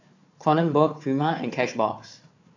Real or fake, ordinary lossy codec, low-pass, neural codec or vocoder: fake; AAC, 48 kbps; 7.2 kHz; codec, 16 kHz, 4 kbps, FunCodec, trained on Chinese and English, 50 frames a second